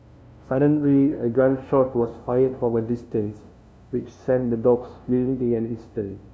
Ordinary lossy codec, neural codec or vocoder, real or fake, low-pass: none; codec, 16 kHz, 0.5 kbps, FunCodec, trained on LibriTTS, 25 frames a second; fake; none